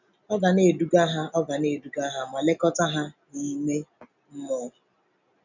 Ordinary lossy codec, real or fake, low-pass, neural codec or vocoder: none; real; 7.2 kHz; none